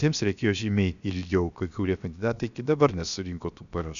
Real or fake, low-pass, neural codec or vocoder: fake; 7.2 kHz; codec, 16 kHz, 0.7 kbps, FocalCodec